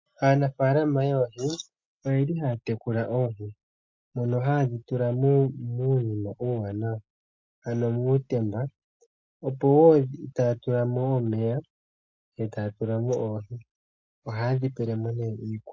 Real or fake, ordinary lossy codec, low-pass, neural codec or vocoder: real; MP3, 48 kbps; 7.2 kHz; none